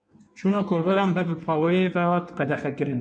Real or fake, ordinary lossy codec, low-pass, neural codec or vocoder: fake; MP3, 64 kbps; 9.9 kHz; codec, 16 kHz in and 24 kHz out, 1.1 kbps, FireRedTTS-2 codec